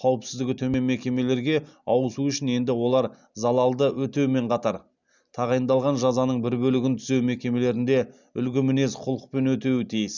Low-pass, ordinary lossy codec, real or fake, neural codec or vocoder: 7.2 kHz; none; real; none